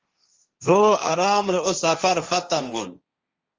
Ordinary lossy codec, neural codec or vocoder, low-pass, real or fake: Opus, 32 kbps; codec, 16 kHz, 1.1 kbps, Voila-Tokenizer; 7.2 kHz; fake